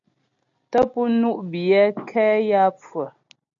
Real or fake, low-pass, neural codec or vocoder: real; 7.2 kHz; none